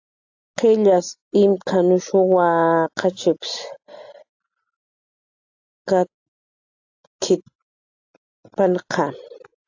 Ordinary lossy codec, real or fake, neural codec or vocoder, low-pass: AAC, 48 kbps; real; none; 7.2 kHz